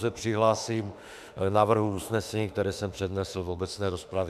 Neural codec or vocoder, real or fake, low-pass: autoencoder, 48 kHz, 32 numbers a frame, DAC-VAE, trained on Japanese speech; fake; 14.4 kHz